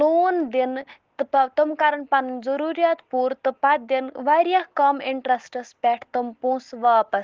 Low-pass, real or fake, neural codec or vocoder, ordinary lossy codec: 7.2 kHz; real; none; Opus, 16 kbps